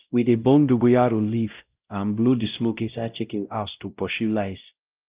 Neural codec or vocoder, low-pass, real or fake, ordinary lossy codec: codec, 16 kHz, 0.5 kbps, X-Codec, WavLM features, trained on Multilingual LibriSpeech; 3.6 kHz; fake; Opus, 32 kbps